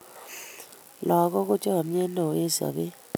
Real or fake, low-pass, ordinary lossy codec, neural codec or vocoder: real; none; none; none